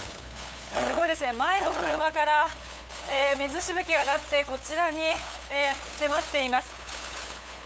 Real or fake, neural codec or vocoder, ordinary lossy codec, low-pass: fake; codec, 16 kHz, 8 kbps, FunCodec, trained on LibriTTS, 25 frames a second; none; none